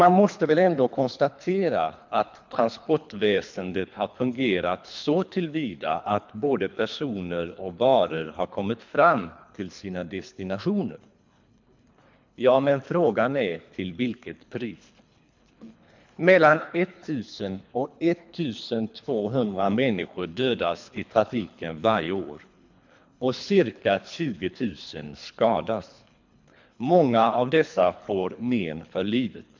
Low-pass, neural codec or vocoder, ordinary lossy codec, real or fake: 7.2 kHz; codec, 24 kHz, 3 kbps, HILCodec; MP3, 64 kbps; fake